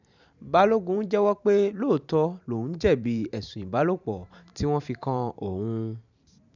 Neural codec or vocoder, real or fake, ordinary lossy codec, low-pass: vocoder, 44.1 kHz, 128 mel bands every 512 samples, BigVGAN v2; fake; none; 7.2 kHz